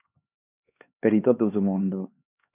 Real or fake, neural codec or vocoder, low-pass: fake; codec, 16 kHz, 2 kbps, X-Codec, HuBERT features, trained on LibriSpeech; 3.6 kHz